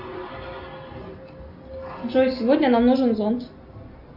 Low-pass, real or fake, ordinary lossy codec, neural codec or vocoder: 5.4 kHz; real; Opus, 64 kbps; none